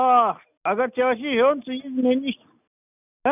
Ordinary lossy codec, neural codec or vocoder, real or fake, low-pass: none; none; real; 3.6 kHz